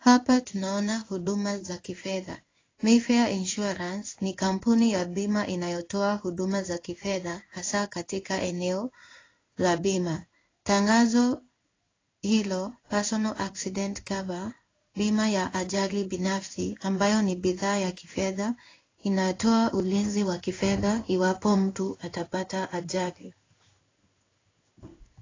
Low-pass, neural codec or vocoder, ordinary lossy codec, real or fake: 7.2 kHz; codec, 16 kHz in and 24 kHz out, 1 kbps, XY-Tokenizer; AAC, 32 kbps; fake